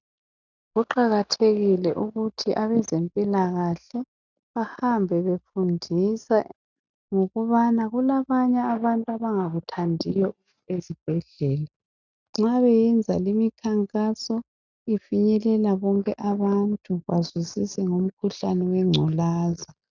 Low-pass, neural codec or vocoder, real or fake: 7.2 kHz; none; real